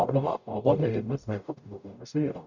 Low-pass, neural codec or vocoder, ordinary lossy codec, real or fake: 7.2 kHz; codec, 44.1 kHz, 0.9 kbps, DAC; none; fake